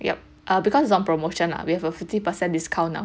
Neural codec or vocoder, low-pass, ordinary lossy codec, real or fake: none; none; none; real